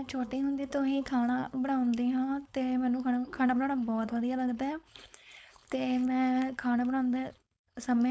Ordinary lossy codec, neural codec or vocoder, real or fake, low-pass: none; codec, 16 kHz, 4.8 kbps, FACodec; fake; none